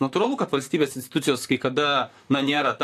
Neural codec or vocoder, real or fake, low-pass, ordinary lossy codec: vocoder, 44.1 kHz, 128 mel bands, Pupu-Vocoder; fake; 14.4 kHz; AAC, 64 kbps